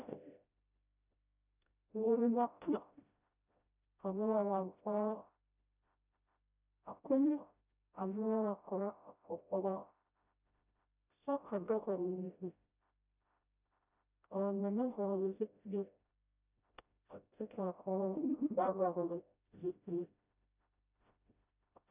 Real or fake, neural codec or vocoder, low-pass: fake; codec, 16 kHz, 0.5 kbps, FreqCodec, smaller model; 3.6 kHz